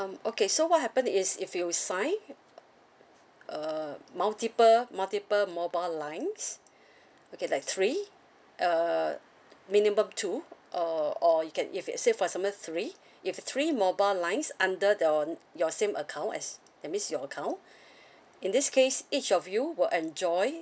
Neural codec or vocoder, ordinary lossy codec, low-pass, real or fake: none; none; none; real